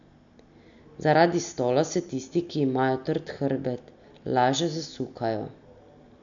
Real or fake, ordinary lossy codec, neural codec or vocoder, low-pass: real; MP3, 64 kbps; none; 7.2 kHz